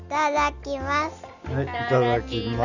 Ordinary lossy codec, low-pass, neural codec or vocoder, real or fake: none; 7.2 kHz; none; real